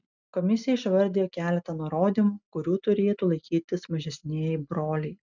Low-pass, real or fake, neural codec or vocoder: 7.2 kHz; real; none